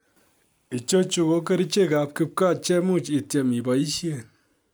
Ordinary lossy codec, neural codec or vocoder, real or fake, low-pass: none; none; real; none